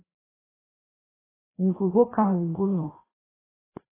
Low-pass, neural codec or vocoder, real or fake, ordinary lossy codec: 3.6 kHz; codec, 16 kHz, 1 kbps, FreqCodec, larger model; fake; AAC, 16 kbps